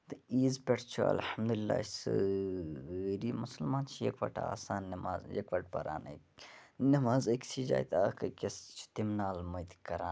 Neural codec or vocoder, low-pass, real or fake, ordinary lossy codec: none; none; real; none